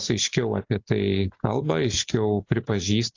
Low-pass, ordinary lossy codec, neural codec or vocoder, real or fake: 7.2 kHz; AAC, 48 kbps; none; real